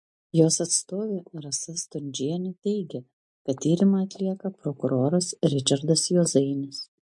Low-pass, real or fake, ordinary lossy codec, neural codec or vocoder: 10.8 kHz; real; MP3, 48 kbps; none